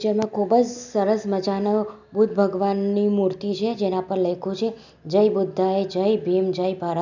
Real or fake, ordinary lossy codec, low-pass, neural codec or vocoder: real; none; 7.2 kHz; none